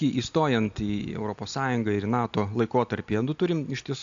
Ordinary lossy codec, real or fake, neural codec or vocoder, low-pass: AAC, 64 kbps; real; none; 7.2 kHz